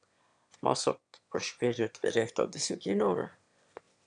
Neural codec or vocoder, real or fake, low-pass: autoencoder, 22.05 kHz, a latent of 192 numbers a frame, VITS, trained on one speaker; fake; 9.9 kHz